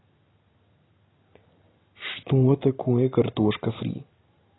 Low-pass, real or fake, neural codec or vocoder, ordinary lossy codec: 7.2 kHz; real; none; AAC, 16 kbps